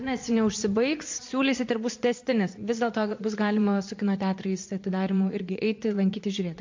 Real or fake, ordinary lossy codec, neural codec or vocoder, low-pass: fake; MP3, 48 kbps; vocoder, 22.05 kHz, 80 mel bands, Vocos; 7.2 kHz